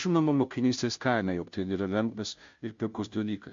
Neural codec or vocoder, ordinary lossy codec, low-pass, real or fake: codec, 16 kHz, 0.5 kbps, FunCodec, trained on Chinese and English, 25 frames a second; MP3, 48 kbps; 7.2 kHz; fake